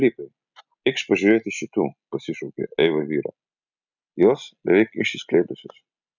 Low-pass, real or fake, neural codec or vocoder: 7.2 kHz; real; none